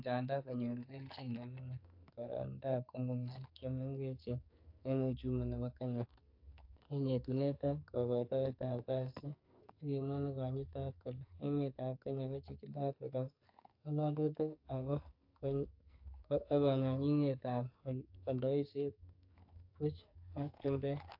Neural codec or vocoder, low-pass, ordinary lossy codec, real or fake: codec, 44.1 kHz, 2.6 kbps, SNAC; 5.4 kHz; AAC, 48 kbps; fake